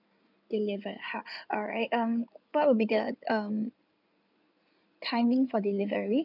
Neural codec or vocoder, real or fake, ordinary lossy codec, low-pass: codec, 16 kHz in and 24 kHz out, 2.2 kbps, FireRedTTS-2 codec; fake; none; 5.4 kHz